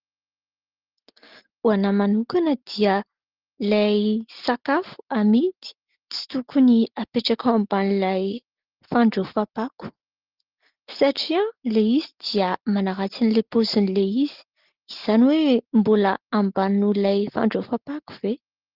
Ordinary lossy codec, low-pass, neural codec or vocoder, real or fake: Opus, 16 kbps; 5.4 kHz; none; real